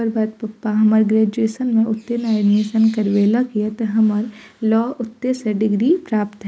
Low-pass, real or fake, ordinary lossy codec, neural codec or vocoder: none; real; none; none